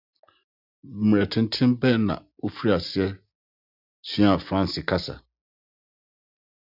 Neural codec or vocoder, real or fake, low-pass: none; real; 5.4 kHz